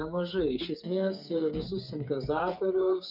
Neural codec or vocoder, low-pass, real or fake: codec, 16 kHz, 8 kbps, FreqCodec, smaller model; 5.4 kHz; fake